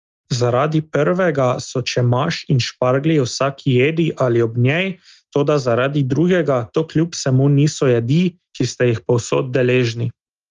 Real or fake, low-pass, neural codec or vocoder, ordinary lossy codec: real; 7.2 kHz; none; Opus, 32 kbps